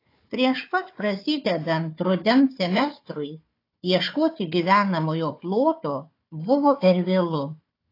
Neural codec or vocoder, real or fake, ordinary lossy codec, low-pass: codec, 16 kHz, 4 kbps, FunCodec, trained on Chinese and English, 50 frames a second; fake; AAC, 32 kbps; 5.4 kHz